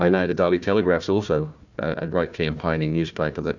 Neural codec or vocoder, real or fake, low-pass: codec, 16 kHz, 1 kbps, FunCodec, trained on Chinese and English, 50 frames a second; fake; 7.2 kHz